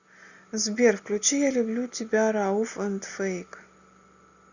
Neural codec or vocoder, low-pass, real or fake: none; 7.2 kHz; real